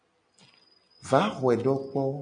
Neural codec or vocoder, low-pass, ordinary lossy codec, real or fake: none; 9.9 kHz; MP3, 96 kbps; real